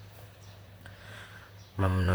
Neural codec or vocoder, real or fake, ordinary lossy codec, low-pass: vocoder, 44.1 kHz, 128 mel bands, Pupu-Vocoder; fake; none; none